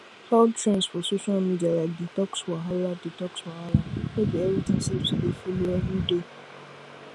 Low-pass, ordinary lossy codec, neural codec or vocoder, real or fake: none; none; none; real